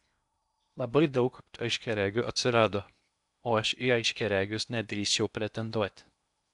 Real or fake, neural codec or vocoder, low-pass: fake; codec, 16 kHz in and 24 kHz out, 0.8 kbps, FocalCodec, streaming, 65536 codes; 10.8 kHz